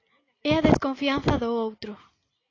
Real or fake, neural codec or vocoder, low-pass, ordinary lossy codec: real; none; 7.2 kHz; AAC, 32 kbps